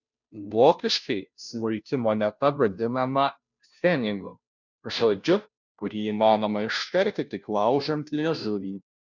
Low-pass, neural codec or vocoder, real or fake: 7.2 kHz; codec, 16 kHz, 0.5 kbps, FunCodec, trained on Chinese and English, 25 frames a second; fake